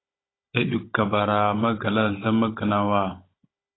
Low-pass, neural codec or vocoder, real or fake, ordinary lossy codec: 7.2 kHz; codec, 16 kHz, 16 kbps, FunCodec, trained on Chinese and English, 50 frames a second; fake; AAC, 16 kbps